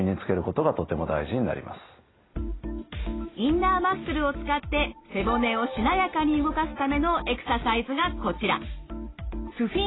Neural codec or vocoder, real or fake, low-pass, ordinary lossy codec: none; real; 7.2 kHz; AAC, 16 kbps